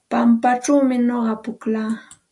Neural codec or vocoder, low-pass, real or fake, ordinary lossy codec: none; 10.8 kHz; real; AAC, 64 kbps